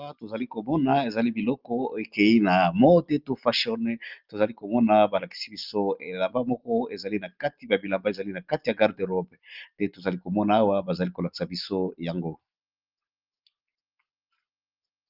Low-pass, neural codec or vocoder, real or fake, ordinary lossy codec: 5.4 kHz; none; real; Opus, 32 kbps